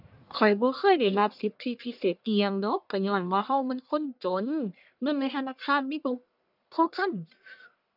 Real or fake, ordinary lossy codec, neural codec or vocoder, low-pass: fake; none; codec, 44.1 kHz, 1.7 kbps, Pupu-Codec; 5.4 kHz